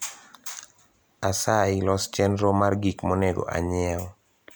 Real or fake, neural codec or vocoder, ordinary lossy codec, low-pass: real; none; none; none